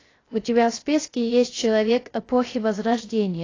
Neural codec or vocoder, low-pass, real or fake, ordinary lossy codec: codec, 16 kHz, 0.3 kbps, FocalCodec; 7.2 kHz; fake; AAC, 32 kbps